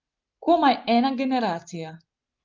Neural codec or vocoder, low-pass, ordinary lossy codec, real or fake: none; 7.2 kHz; Opus, 32 kbps; real